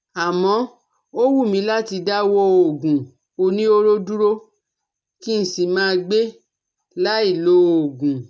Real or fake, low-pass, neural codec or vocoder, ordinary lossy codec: real; none; none; none